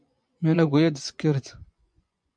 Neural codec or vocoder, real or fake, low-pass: vocoder, 24 kHz, 100 mel bands, Vocos; fake; 9.9 kHz